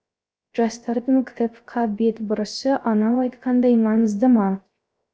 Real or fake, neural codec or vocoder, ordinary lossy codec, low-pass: fake; codec, 16 kHz, 0.3 kbps, FocalCodec; none; none